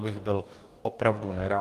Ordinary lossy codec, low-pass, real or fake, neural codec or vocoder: Opus, 32 kbps; 14.4 kHz; fake; codec, 44.1 kHz, 2.6 kbps, DAC